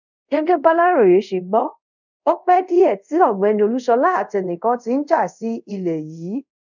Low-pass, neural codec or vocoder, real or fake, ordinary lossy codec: 7.2 kHz; codec, 24 kHz, 0.5 kbps, DualCodec; fake; none